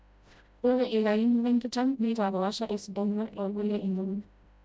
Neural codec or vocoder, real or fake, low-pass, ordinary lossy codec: codec, 16 kHz, 0.5 kbps, FreqCodec, smaller model; fake; none; none